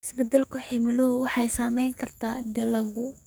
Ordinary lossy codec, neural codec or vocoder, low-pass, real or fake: none; codec, 44.1 kHz, 2.6 kbps, SNAC; none; fake